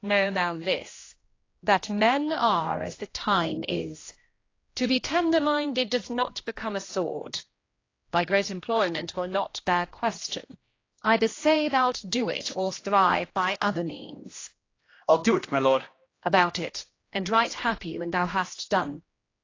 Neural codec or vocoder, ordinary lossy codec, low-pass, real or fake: codec, 16 kHz, 1 kbps, X-Codec, HuBERT features, trained on general audio; AAC, 32 kbps; 7.2 kHz; fake